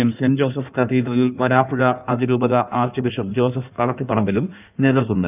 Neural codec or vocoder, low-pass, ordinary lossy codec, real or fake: codec, 16 kHz in and 24 kHz out, 1.1 kbps, FireRedTTS-2 codec; 3.6 kHz; none; fake